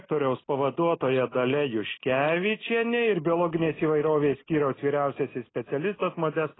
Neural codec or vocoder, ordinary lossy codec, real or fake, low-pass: none; AAC, 16 kbps; real; 7.2 kHz